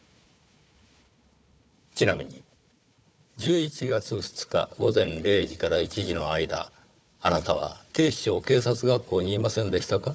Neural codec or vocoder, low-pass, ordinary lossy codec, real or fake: codec, 16 kHz, 4 kbps, FunCodec, trained on Chinese and English, 50 frames a second; none; none; fake